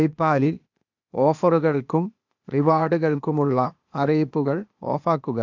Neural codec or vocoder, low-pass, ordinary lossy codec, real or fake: codec, 16 kHz, 0.8 kbps, ZipCodec; 7.2 kHz; none; fake